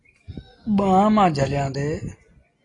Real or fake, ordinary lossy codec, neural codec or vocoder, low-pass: real; AAC, 32 kbps; none; 10.8 kHz